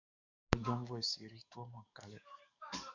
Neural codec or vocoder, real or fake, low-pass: codec, 44.1 kHz, 7.8 kbps, DAC; fake; 7.2 kHz